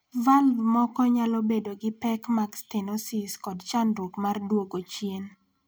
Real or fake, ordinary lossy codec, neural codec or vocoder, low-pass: real; none; none; none